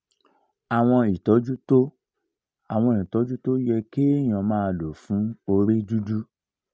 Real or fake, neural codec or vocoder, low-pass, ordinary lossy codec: real; none; none; none